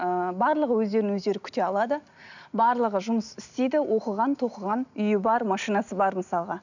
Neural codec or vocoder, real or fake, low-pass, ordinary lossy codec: none; real; 7.2 kHz; none